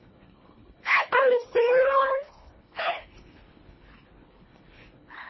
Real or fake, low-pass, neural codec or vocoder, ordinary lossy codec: fake; 7.2 kHz; codec, 24 kHz, 1.5 kbps, HILCodec; MP3, 24 kbps